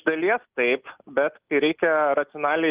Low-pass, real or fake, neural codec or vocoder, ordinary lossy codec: 3.6 kHz; real; none; Opus, 32 kbps